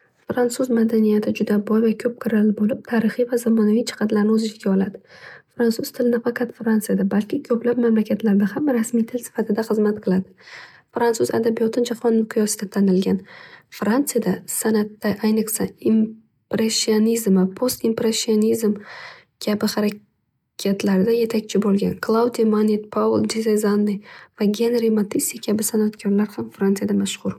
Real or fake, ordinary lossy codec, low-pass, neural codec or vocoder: real; MP3, 96 kbps; 19.8 kHz; none